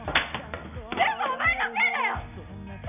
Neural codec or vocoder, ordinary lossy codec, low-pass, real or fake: none; none; 3.6 kHz; real